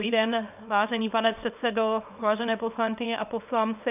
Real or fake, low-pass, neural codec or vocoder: fake; 3.6 kHz; codec, 24 kHz, 0.9 kbps, WavTokenizer, small release